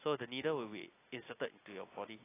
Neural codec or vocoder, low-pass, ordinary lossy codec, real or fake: none; 3.6 kHz; AAC, 16 kbps; real